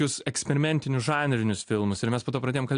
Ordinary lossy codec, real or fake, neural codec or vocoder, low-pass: AAC, 64 kbps; real; none; 9.9 kHz